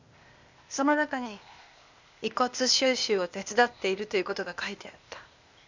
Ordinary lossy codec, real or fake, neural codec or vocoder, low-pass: Opus, 64 kbps; fake; codec, 16 kHz, 0.8 kbps, ZipCodec; 7.2 kHz